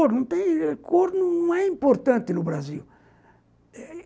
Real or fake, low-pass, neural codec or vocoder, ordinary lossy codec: real; none; none; none